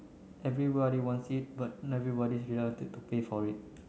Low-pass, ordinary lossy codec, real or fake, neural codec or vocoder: none; none; real; none